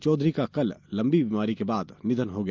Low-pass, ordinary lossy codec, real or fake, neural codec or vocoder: 7.2 kHz; Opus, 24 kbps; real; none